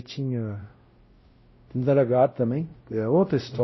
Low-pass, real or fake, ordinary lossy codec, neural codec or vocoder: 7.2 kHz; fake; MP3, 24 kbps; codec, 16 kHz, 0.5 kbps, X-Codec, WavLM features, trained on Multilingual LibriSpeech